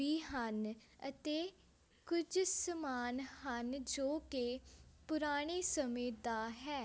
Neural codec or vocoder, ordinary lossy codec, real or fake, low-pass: none; none; real; none